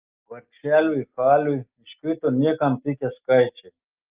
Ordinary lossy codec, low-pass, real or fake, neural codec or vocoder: Opus, 32 kbps; 3.6 kHz; real; none